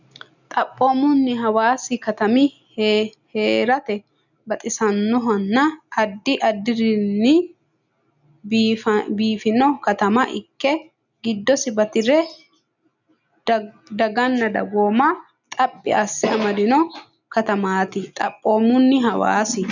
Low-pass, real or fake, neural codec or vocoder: 7.2 kHz; real; none